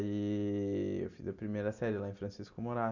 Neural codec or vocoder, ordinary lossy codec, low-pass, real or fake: none; none; 7.2 kHz; real